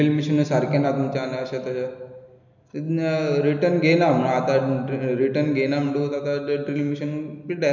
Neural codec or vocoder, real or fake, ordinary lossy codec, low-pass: none; real; none; 7.2 kHz